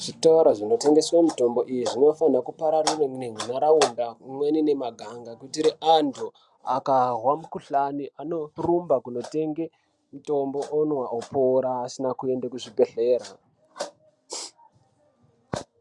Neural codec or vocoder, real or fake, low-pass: none; real; 10.8 kHz